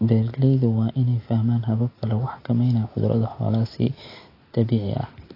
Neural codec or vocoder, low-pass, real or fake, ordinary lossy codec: none; 5.4 kHz; real; AAC, 24 kbps